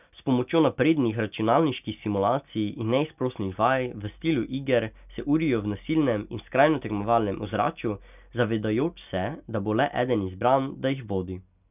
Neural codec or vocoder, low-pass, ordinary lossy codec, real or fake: none; 3.6 kHz; none; real